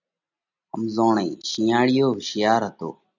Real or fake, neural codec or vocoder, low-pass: real; none; 7.2 kHz